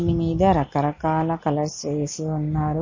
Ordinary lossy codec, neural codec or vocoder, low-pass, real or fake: MP3, 32 kbps; none; 7.2 kHz; real